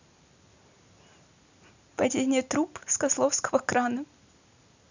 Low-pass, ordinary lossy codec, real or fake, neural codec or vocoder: 7.2 kHz; none; real; none